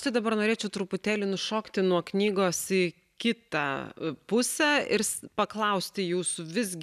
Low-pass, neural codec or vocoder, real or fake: 14.4 kHz; none; real